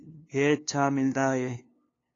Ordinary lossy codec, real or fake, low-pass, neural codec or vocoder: AAC, 32 kbps; fake; 7.2 kHz; codec, 16 kHz, 2 kbps, FunCodec, trained on LibriTTS, 25 frames a second